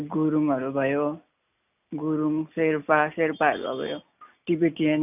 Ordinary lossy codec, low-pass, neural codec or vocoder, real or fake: none; 3.6 kHz; none; real